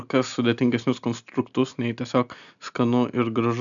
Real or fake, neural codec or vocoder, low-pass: real; none; 7.2 kHz